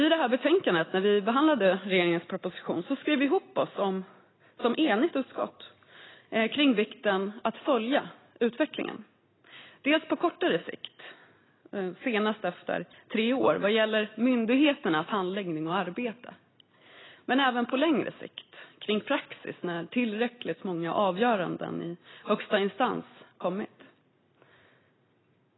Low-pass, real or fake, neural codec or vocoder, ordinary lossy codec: 7.2 kHz; real; none; AAC, 16 kbps